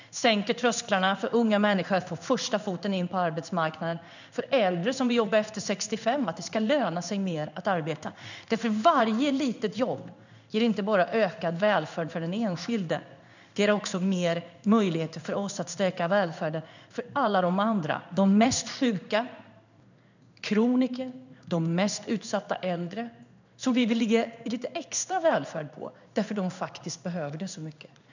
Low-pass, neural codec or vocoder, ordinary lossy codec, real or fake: 7.2 kHz; codec, 16 kHz in and 24 kHz out, 1 kbps, XY-Tokenizer; none; fake